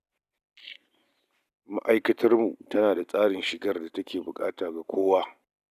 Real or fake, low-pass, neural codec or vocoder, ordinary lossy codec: real; 14.4 kHz; none; none